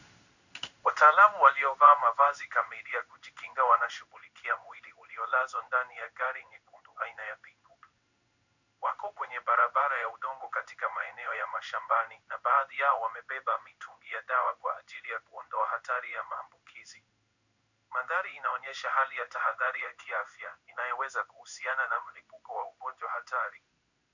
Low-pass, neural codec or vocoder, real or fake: 7.2 kHz; codec, 16 kHz in and 24 kHz out, 1 kbps, XY-Tokenizer; fake